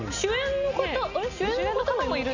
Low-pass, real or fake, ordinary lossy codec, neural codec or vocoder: 7.2 kHz; real; none; none